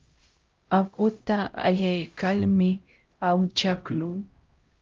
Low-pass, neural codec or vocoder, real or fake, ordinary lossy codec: 7.2 kHz; codec, 16 kHz, 0.5 kbps, X-Codec, HuBERT features, trained on LibriSpeech; fake; Opus, 32 kbps